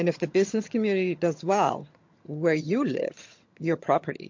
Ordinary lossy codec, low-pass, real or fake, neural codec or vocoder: MP3, 48 kbps; 7.2 kHz; fake; vocoder, 22.05 kHz, 80 mel bands, HiFi-GAN